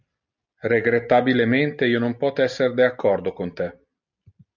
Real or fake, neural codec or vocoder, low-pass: real; none; 7.2 kHz